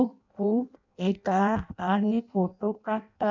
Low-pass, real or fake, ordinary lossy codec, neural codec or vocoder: 7.2 kHz; fake; none; codec, 16 kHz in and 24 kHz out, 0.6 kbps, FireRedTTS-2 codec